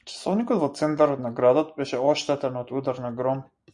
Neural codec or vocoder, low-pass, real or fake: none; 10.8 kHz; real